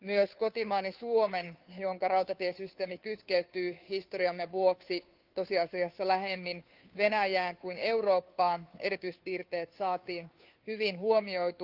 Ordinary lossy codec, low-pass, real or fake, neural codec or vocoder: Opus, 24 kbps; 5.4 kHz; fake; codec, 16 kHz, 2 kbps, FunCodec, trained on Chinese and English, 25 frames a second